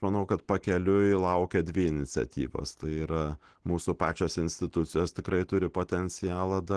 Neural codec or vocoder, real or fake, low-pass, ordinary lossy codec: none; real; 9.9 kHz; Opus, 16 kbps